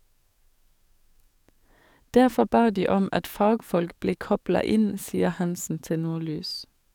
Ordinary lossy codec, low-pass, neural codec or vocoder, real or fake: none; 19.8 kHz; codec, 44.1 kHz, 7.8 kbps, DAC; fake